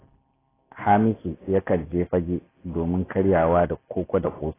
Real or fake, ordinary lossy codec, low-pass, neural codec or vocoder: real; AAC, 16 kbps; 3.6 kHz; none